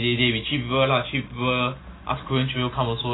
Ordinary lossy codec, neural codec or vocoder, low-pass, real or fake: AAC, 16 kbps; none; 7.2 kHz; real